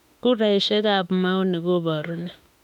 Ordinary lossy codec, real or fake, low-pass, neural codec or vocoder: none; fake; 19.8 kHz; autoencoder, 48 kHz, 32 numbers a frame, DAC-VAE, trained on Japanese speech